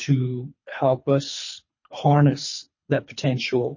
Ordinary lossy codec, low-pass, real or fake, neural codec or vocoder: MP3, 32 kbps; 7.2 kHz; fake; codec, 24 kHz, 3 kbps, HILCodec